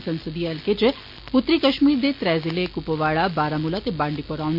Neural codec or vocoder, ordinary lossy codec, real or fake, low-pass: none; MP3, 32 kbps; real; 5.4 kHz